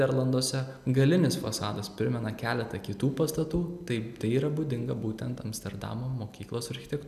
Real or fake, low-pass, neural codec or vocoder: real; 14.4 kHz; none